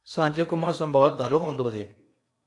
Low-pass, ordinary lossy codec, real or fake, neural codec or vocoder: 10.8 kHz; AAC, 64 kbps; fake; codec, 16 kHz in and 24 kHz out, 0.8 kbps, FocalCodec, streaming, 65536 codes